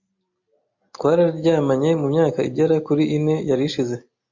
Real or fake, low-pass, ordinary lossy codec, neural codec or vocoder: real; 7.2 kHz; MP3, 48 kbps; none